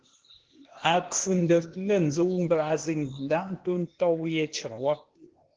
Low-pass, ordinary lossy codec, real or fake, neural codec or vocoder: 7.2 kHz; Opus, 16 kbps; fake; codec, 16 kHz, 0.8 kbps, ZipCodec